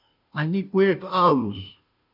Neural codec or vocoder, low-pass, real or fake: codec, 16 kHz, 0.5 kbps, FunCodec, trained on Chinese and English, 25 frames a second; 5.4 kHz; fake